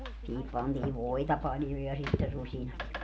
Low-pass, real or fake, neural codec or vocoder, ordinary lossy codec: none; real; none; none